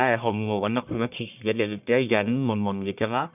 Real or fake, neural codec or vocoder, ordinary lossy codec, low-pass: fake; codec, 44.1 kHz, 1.7 kbps, Pupu-Codec; none; 3.6 kHz